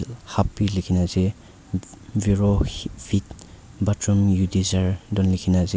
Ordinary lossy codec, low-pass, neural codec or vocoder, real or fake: none; none; none; real